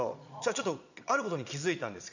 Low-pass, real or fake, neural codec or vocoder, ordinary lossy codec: 7.2 kHz; fake; vocoder, 22.05 kHz, 80 mel bands, Vocos; none